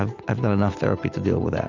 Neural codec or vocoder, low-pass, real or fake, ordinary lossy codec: none; 7.2 kHz; real; Opus, 64 kbps